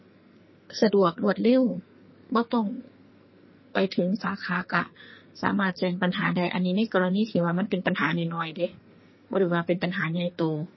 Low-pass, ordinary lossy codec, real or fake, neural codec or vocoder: 7.2 kHz; MP3, 24 kbps; fake; codec, 44.1 kHz, 2.6 kbps, SNAC